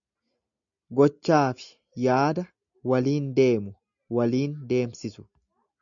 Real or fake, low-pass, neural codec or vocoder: real; 7.2 kHz; none